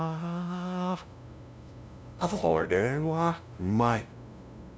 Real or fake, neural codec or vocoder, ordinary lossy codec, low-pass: fake; codec, 16 kHz, 0.5 kbps, FunCodec, trained on LibriTTS, 25 frames a second; none; none